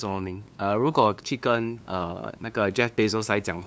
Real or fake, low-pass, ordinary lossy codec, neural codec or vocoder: fake; none; none; codec, 16 kHz, 2 kbps, FunCodec, trained on LibriTTS, 25 frames a second